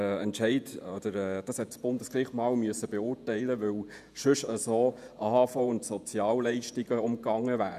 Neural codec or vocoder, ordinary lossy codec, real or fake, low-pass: vocoder, 48 kHz, 128 mel bands, Vocos; none; fake; 14.4 kHz